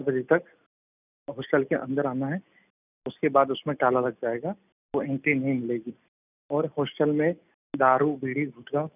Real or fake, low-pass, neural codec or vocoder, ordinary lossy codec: real; 3.6 kHz; none; none